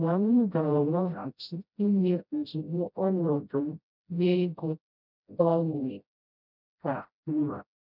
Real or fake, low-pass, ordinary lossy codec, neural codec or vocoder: fake; 5.4 kHz; none; codec, 16 kHz, 0.5 kbps, FreqCodec, smaller model